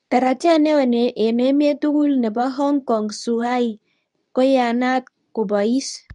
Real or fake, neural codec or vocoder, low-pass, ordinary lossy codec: fake; codec, 24 kHz, 0.9 kbps, WavTokenizer, medium speech release version 2; 10.8 kHz; none